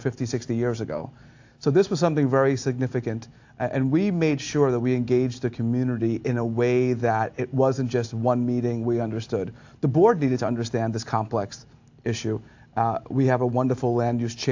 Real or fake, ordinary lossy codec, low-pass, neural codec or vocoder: real; AAC, 48 kbps; 7.2 kHz; none